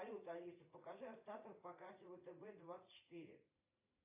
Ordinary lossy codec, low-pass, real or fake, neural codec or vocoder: AAC, 24 kbps; 3.6 kHz; fake; vocoder, 44.1 kHz, 80 mel bands, Vocos